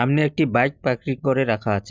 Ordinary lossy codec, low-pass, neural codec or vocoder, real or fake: none; none; none; real